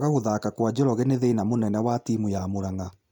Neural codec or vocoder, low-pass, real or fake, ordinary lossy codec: none; 19.8 kHz; real; none